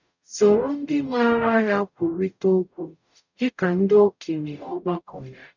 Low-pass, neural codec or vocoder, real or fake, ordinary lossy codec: 7.2 kHz; codec, 44.1 kHz, 0.9 kbps, DAC; fake; none